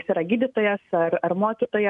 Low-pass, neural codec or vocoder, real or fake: 10.8 kHz; none; real